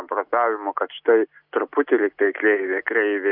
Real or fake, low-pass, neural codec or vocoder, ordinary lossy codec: real; 5.4 kHz; none; Opus, 64 kbps